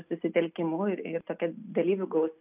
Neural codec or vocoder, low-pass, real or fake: none; 3.6 kHz; real